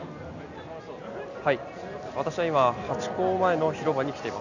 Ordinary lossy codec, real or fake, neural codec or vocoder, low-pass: none; real; none; 7.2 kHz